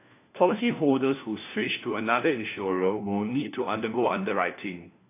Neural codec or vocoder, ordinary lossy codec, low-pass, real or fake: codec, 16 kHz, 1 kbps, FunCodec, trained on LibriTTS, 50 frames a second; AAC, 24 kbps; 3.6 kHz; fake